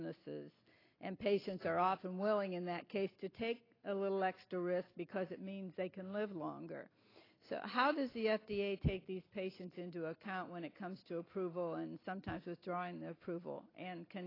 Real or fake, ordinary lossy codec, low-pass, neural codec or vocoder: real; AAC, 24 kbps; 5.4 kHz; none